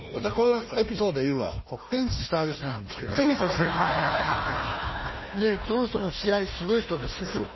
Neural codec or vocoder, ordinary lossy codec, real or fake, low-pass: codec, 16 kHz, 1 kbps, FunCodec, trained on Chinese and English, 50 frames a second; MP3, 24 kbps; fake; 7.2 kHz